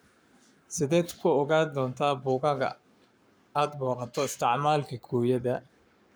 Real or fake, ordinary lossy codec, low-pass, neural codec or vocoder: fake; none; none; codec, 44.1 kHz, 7.8 kbps, Pupu-Codec